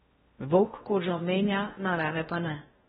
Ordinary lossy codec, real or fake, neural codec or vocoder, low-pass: AAC, 16 kbps; fake; codec, 16 kHz in and 24 kHz out, 0.6 kbps, FocalCodec, streaming, 4096 codes; 10.8 kHz